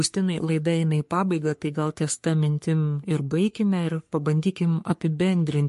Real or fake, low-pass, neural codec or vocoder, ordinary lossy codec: fake; 14.4 kHz; codec, 44.1 kHz, 3.4 kbps, Pupu-Codec; MP3, 48 kbps